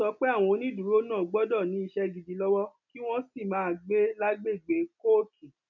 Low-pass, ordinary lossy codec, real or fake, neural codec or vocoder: 7.2 kHz; none; real; none